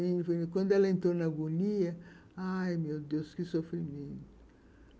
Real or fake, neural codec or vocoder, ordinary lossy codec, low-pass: real; none; none; none